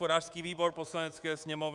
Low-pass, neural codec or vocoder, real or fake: 10.8 kHz; codec, 24 kHz, 3.1 kbps, DualCodec; fake